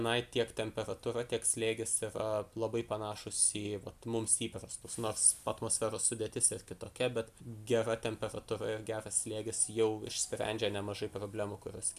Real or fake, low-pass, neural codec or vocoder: real; 14.4 kHz; none